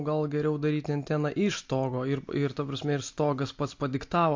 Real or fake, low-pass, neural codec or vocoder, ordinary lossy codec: real; 7.2 kHz; none; MP3, 48 kbps